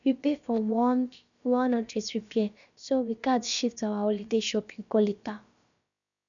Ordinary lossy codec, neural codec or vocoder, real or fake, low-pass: none; codec, 16 kHz, about 1 kbps, DyCAST, with the encoder's durations; fake; 7.2 kHz